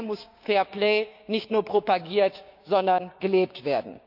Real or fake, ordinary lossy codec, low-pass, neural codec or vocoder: fake; none; 5.4 kHz; codec, 16 kHz, 6 kbps, DAC